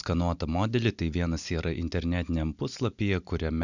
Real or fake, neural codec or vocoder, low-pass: real; none; 7.2 kHz